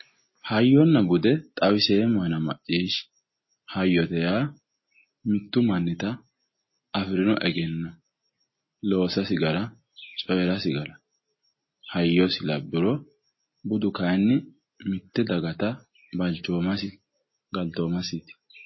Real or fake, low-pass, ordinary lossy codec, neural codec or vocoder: real; 7.2 kHz; MP3, 24 kbps; none